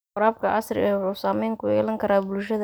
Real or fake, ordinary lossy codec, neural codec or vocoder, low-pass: real; none; none; none